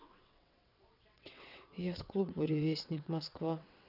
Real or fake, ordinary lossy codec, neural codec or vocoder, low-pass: fake; none; vocoder, 22.05 kHz, 80 mel bands, WaveNeXt; 5.4 kHz